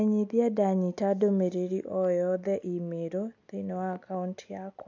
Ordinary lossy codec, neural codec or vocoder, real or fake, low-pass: AAC, 48 kbps; none; real; 7.2 kHz